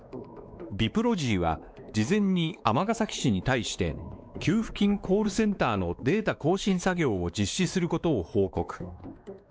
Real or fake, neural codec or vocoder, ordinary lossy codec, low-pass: fake; codec, 16 kHz, 2 kbps, X-Codec, WavLM features, trained on Multilingual LibriSpeech; none; none